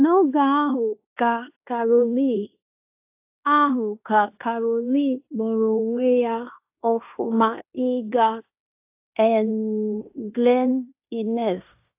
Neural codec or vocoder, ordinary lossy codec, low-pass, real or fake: codec, 16 kHz in and 24 kHz out, 0.9 kbps, LongCat-Audio-Codec, fine tuned four codebook decoder; AAC, 32 kbps; 3.6 kHz; fake